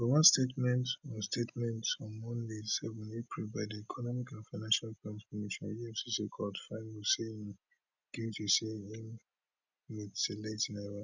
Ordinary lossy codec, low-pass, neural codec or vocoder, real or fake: none; 7.2 kHz; none; real